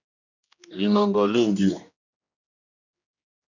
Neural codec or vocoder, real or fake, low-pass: codec, 16 kHz, 1 kbps, X-Codec, HuBERT features, trained on general audio; fake; 7.2 kHz